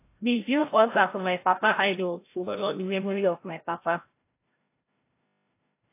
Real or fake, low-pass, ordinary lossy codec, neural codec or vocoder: fake; 3.6 kHz; AAC, 24 kbps; codec, 16 kHz, 0.5 kbps, FreqCodec, larger model